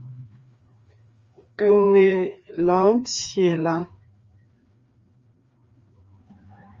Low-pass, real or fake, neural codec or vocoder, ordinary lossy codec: 7.2 kHz; fake; codec, 16 kHz, 2 kbps, FreqCodec, larger model; Opus, 32 kbps